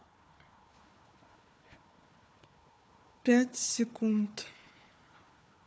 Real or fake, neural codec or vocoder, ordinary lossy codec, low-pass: fake; codec, 16 kHz, 4 kbps, FunCodec, trained on Chinese and English, 50 frames a second; none; none